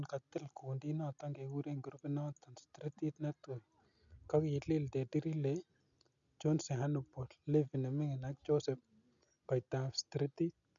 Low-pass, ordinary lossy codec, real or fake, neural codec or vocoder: 7.2 kHz; none; real; none